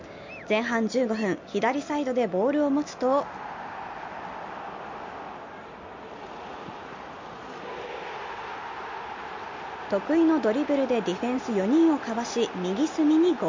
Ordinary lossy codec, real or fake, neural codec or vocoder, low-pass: MP3, 64 kbps; real; none; 7.2 kHz